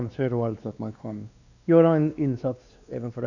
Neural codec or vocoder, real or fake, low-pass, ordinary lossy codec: codec, 16 kHz, 2 kbps, X-Codec, WavLM features, trained on Multilingual LibriSpeech; fake; 7.2 kHz; none